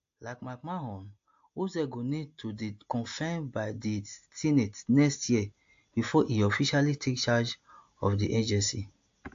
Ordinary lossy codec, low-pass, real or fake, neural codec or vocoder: AAC, 48 kbps; 7.2 kHz; real; none